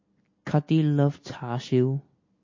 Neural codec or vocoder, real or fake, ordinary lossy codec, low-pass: none; real; MP3, 32 kbps; 7.2 kHz